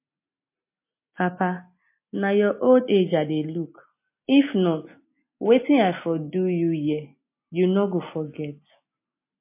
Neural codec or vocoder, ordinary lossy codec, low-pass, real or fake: none; MP3, 24 kbps; 3.6 kHz; real